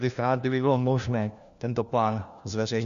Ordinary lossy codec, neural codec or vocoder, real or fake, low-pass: Opus, 64 kbps; codec, 16 kHz, 1 kbps, FunCodec, trained on LibriTTS, 50 frames a second; fake; 7.2 kHz